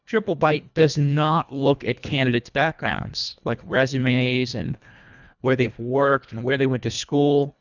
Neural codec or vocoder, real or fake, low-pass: codec, 24 kHz, 1.5 kbps, HILCodec; fake; 7.2 kHz